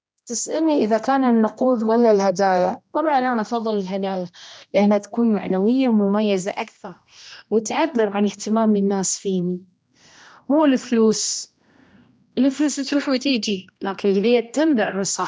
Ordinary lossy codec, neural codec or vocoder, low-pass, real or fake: none; codec, 16 kHz, 1 kbps, X-Codec, HuBERT features, trained on general audio; none; fake